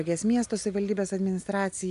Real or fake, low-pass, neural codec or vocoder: real; 10.8 kHz; none